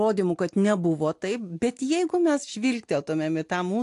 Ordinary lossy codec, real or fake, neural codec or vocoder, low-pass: AAC, 64 kbps; real; none; 10.8 kHz